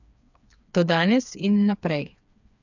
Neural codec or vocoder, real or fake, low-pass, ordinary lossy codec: codec, 16 kHz, 4 kbps, FreqCodec, smaller model; fake; 7.2 kHz; none